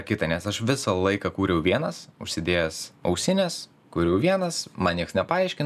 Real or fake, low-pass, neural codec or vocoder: real; 14.4 kHz; none